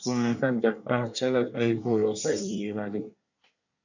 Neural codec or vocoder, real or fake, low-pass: codec, 24 kHz, 1 kbps, SNAC; fake; 7.2 kHz